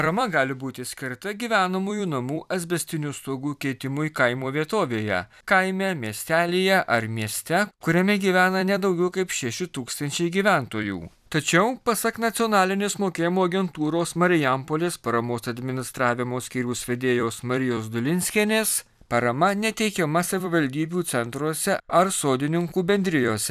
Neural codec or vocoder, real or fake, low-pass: vocoder, 44.1 kHz, 128 mel bands every 256 samples, BigVGAN v2; fake; 14.4 kHz